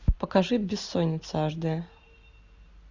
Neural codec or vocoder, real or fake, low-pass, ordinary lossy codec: none; real; 7.2 kHz; Opus, 64 kbps